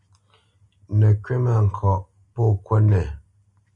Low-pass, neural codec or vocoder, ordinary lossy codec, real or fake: 10.8 kHz; none; MP3, 48 kbps; real